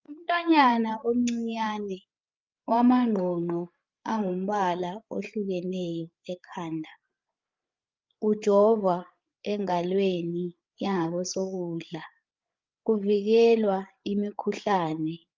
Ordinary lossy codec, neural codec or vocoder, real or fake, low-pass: Opus, 24 kbps; codec, 16 kHz, 8 kbps, FreqCodec, larger model; fake; 7.2 kHz